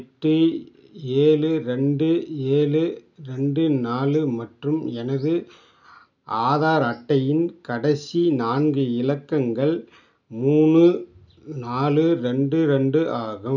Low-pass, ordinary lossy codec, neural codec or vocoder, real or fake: 7.2 kHz; none; none; real